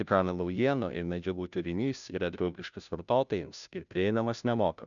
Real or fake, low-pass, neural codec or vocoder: fake; 7.2 kHz; codec, 16 kHz, 0.5 kbps, FunCodec, trained on Chinese and English, 25 frames a second